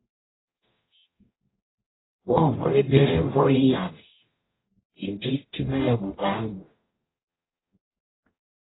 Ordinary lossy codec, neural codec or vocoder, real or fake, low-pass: AAC, 16 kbps; codec, 44.1 kHz, 0.9 kbps, DAC; fake; 7.2 kHz